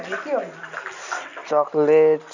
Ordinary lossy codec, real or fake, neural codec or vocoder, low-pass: none; real; none; 7.2 kHz